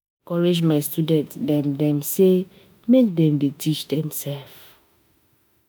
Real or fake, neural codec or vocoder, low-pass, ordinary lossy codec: fake; autoencoder, 48 kHz, 32 numbers a frame, DAC-VAE, trained on Japanese speech; none; none